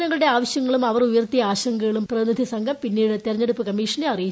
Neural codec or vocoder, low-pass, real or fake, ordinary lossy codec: none; none; real; none